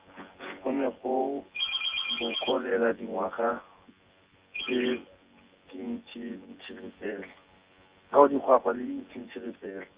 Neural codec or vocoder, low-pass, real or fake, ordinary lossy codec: vocoder, 24 kHz, 100 mel bands, Vocos; 3.6 kHz; fake; Opus, 32 kbps